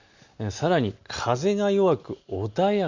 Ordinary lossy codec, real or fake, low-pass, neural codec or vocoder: Opus, 64 kbps; real; 7.2 kHz; none